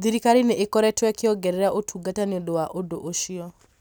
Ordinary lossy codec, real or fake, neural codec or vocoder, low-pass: none; real; none; none